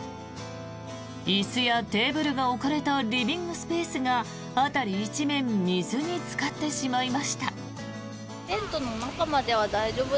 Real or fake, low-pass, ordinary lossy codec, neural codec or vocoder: real; none; none; none